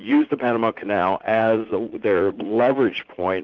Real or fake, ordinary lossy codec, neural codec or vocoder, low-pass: fake; Opus, 32 kbps; vocoder, 44.1 kHz, 80 mel bands, Vocos; 7.2 kHz